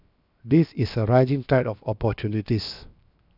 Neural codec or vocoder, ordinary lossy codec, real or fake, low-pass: codec, 16 kHz, 0.7 kbps, FocalCodec; none; fake; 5.4 kHz